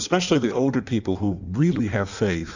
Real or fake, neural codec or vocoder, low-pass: fake; codec, 16 kHz in and 24 kHz out, 1.1 kbps, FireRedTTS-2 codec; 7.2 kHz